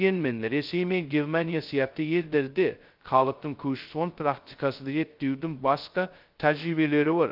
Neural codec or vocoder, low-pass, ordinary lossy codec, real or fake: codec, 16 kHz, 0.2 kbps, FocalCodec; 5.4 kHz; Opus, 24 kbps; fake